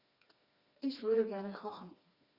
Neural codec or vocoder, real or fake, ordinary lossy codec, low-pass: codec, 16 kHz, 2 kbps, FreqCodec, smaller model; fake; Opus, 64 kbps; 5.4 kHz